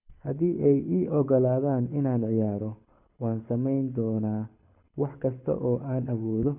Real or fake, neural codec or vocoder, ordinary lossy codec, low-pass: fake; codec, 24 kHz, 6 kbps, HILCodec; none; 3.6 kHz